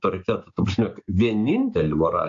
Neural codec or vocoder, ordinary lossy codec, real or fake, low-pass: codec, 16 kHz, 6 kbps, DAC; AAC, 48 kbps; fake; 7.2 kHz